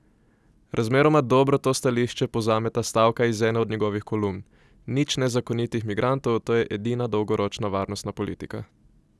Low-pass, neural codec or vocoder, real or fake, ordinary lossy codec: none; none; real; none